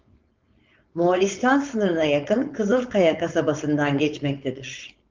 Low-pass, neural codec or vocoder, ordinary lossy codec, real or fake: 7.2 kHz; codec, 16 kHz, 4.8 kbps, FACodec; Opus, 24 kbps; fake